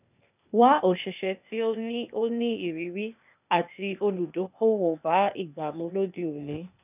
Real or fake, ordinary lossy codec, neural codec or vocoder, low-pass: fake; none; codec, 16 kHz, 0.8 kbps, ZipCodec; 3.6 kHz